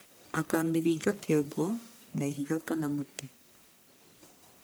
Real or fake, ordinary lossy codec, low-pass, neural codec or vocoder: fake; none; none; codec, 44.1 kHz, 1.7 kbps, Pupu-Codec